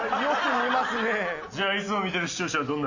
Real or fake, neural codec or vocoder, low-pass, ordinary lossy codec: real; none; 7.2 kHz; AAC, 48 kbps